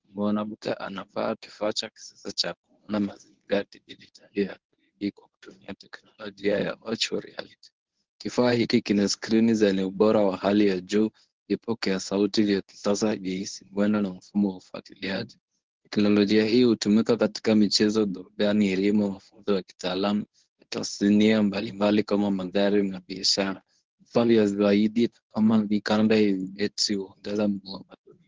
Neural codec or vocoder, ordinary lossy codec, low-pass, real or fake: codec, 24 kHz, 0.9 kbps, WavTokenizer, medium speech release version 1; Opus, 16 kbps; 7.2 kHz; fake